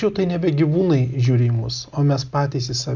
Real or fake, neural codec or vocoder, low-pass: real; none; 7.2 kHz